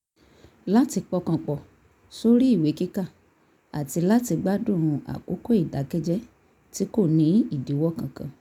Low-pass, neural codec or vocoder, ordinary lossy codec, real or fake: 19.8 kHz; none; none; real